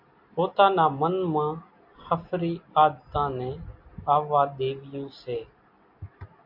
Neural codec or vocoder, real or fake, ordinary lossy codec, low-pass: none; real; MP3, 48 kbps; 5.4 kHz